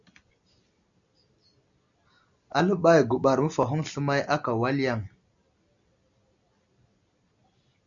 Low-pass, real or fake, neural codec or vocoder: 7.2 kHz; real; none